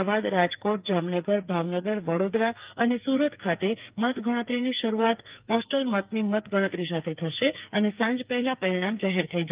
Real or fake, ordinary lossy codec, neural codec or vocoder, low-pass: fake; Opus, 32 kbps; codec, 44.1 kHz, 2.6 kbps, SNAC; 3.6 kHz